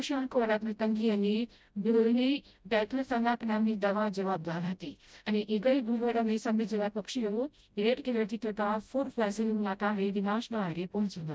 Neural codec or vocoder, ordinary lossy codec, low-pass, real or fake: codec, 16 kHz, 0.5 kbps, FreqCodec, smaller model; none; none; fake